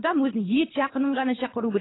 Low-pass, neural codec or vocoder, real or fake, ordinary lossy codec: 7.2 kHz; codec, 24 kHz, 3 kbps, HILCodec; fake; AAC, 16 kbps